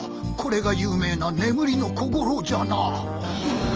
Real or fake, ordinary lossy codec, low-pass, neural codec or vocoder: real; Opus, 24 kbps; 7.2 kHz; none